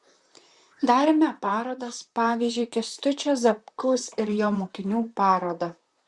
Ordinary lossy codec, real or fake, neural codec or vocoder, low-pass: Opus, 64 kbps; fake; vocoder, 44.1 kHz, 128 mel bands, Pupu-Vocoder; 10.8 kHz